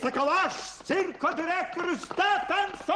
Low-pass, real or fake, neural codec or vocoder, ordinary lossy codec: 10.8 kHz; real; none; Opus, 16 kbps